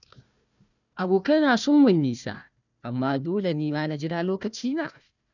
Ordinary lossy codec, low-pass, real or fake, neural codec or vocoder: none; 7.2 kHz; fake; codec, 16 kHz, 1 kbps, FunCodec, trained on Chinese and English, 50 frames a second